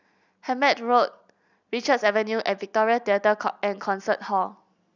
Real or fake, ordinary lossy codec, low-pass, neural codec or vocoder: real; none; 7.2 kHz; none